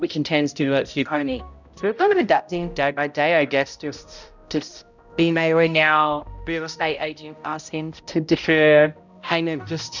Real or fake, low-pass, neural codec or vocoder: fake; 7.2 kHz; codec, 16 kHz, 0.5 kbps, X-Codec, HuBERT features, trained on balanced general audio